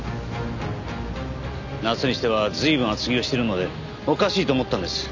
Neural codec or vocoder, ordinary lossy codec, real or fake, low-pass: none; none; real; 7.2 kHz